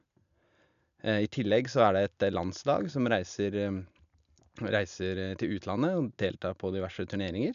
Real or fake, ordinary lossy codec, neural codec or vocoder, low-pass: real; none; none; 7.2 kHz